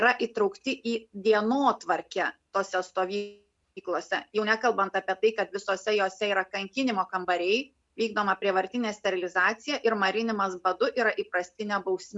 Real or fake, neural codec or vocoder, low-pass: real; none; 10.8 kHz